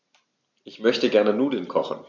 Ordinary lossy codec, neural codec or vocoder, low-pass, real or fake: none; none; 7.2 kHz; real